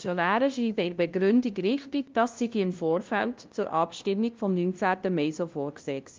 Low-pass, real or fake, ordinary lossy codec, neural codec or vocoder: 7.2 kHz; fake; Opus, 32 kbps; codec, 16 kHz, 0.5 kbps, FunCodec, trained on LibriTTS, 25 frames a second